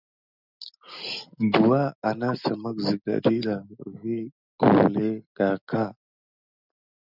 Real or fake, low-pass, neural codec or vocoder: real; 5.4 kHz; none